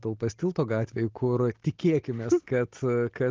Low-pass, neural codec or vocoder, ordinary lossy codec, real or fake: 7.2 kHz; none; Opus, 16 kbps; real